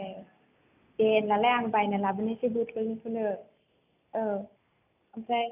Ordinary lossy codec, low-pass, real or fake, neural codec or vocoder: none; 3.6 kHz; real; none